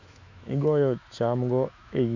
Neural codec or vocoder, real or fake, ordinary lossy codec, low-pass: autoencoder, 48 kHz, 128 numbers a frame, DAC-VAE, trained on Japanese speech; fake; none; 7.2 kHz